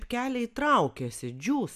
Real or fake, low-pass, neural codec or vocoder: real; 14.4 kHz; none